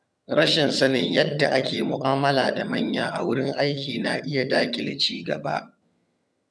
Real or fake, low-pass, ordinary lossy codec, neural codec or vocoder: fake; none; none; vocoder, 22.05 kHz, 80 mel bands, HiFi-GAN